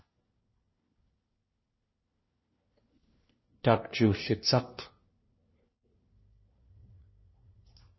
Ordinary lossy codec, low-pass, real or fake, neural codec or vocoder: MP3, 24 kbps; 7.2 kHz; fake; codec, 16 kHz, 1 kbps, FunCodec, trained on LibriTTS, 50 frames a second